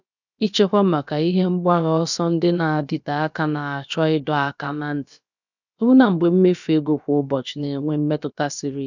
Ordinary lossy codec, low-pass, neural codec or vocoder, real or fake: none; 7.2 kHz; codec, 16 kHz, about 1 kbps, DyCAST, with the encoder's durations; fake